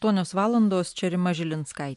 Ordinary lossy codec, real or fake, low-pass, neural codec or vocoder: MP3, 64 kbps; real; 10.8 kHz; none